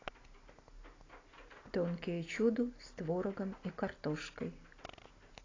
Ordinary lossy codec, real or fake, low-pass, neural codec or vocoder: AAC, 32 kbps; real; 7.2 kHz; none